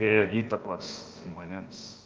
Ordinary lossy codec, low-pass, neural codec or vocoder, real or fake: Opus, 24 kbps; 7.2 kHz; codec, 16 kHz, about 1 kbps, DyCAST, with the encoder's durations; fake